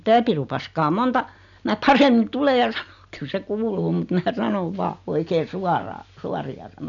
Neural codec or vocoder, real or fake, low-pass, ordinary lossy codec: none; real; 7.2 kHz; none